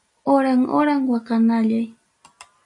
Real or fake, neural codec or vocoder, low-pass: real; none; 10.8 kHz